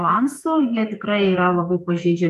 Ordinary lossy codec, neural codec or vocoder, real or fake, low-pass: MP3, 96 kbps; codec, 44.1 kHz, 2.6 kbps, SNAC; fake; 14.4 kHz